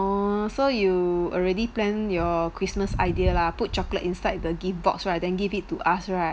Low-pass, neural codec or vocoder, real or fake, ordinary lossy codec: none; none; real; none